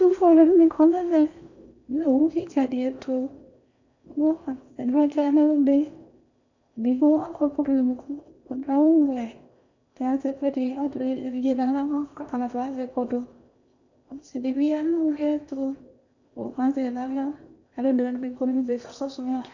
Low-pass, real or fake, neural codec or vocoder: 7.2 kHz; fake; codec, 16 kHz in and 24 kHz out, 0.8 kbps, FocalCodec, streaming, 65536 codes